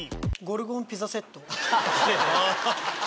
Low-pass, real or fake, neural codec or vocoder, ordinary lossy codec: none; real; none; none